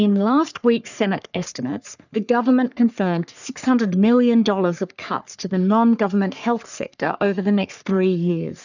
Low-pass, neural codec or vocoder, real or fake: 7.2 kHz; codec, 44.1 kHz, 3.4 kbps, Pupu-Codec; fake